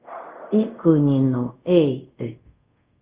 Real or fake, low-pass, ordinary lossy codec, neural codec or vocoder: fake; 3.6 kHz; Opus, 32 kbps; codec, 24 kHz, 0.5 kbps, DualCodec